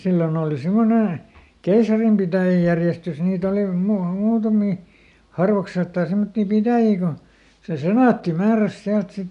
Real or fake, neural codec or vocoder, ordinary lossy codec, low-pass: real; none; none; 10.8 kHz